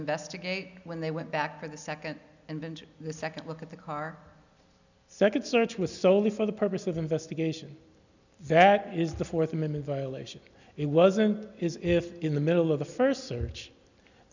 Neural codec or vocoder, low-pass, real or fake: none; 7.2 kHz; real